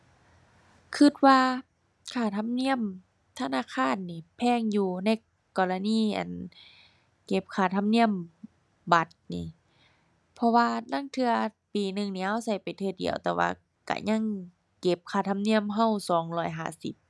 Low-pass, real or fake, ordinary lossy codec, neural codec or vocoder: none; real; none; none